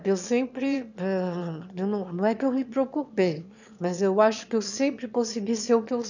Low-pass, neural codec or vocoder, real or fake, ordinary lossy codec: 7.2 kHz; autoencoder, 22.05 kHz, a latent of 192 numbers a frame, VITS, trained on one speaker; fake; none